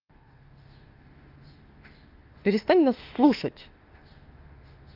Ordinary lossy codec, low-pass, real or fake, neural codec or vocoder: Opus, 32 kbps; 5.4 kHz; fake; autoencoder, 48 kHz, 32 numbers a frame, DAC-VAE, trained on Japanese speech